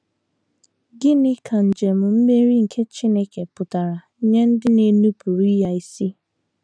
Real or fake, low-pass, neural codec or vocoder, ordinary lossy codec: real; 9.9 kHz; none; none